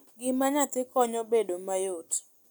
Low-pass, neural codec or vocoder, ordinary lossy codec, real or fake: none; none; none; real